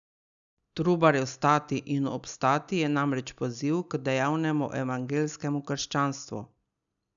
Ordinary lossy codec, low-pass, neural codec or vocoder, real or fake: none; 7.2 kHz; none; real